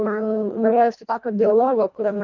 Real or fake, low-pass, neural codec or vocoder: fake; 7.2 kHz; codec, 24 kHz, 1.5 kbps, HILCodec